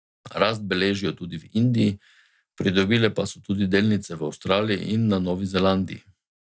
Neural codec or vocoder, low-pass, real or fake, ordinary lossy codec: none; none; real; none